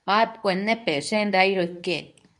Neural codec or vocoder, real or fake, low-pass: codec, 24 kHz, 0.9 kbps, WavTokenizer, medium speech release version 2; fake; 10.8 kHz